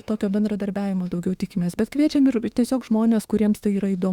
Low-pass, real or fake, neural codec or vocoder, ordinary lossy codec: 19.8 kHz; fake; autoencoder, 48 kHz, 32 numbers a frame, DAC-VAE, trained on Japanese speech; Opus, 64 kbps